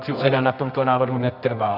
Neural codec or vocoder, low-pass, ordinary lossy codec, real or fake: codec, 24 kHz, 0.9 kbps, WavTokenizer, medium music audio release; 5.4 kHz; AAC, 48 kbps; fake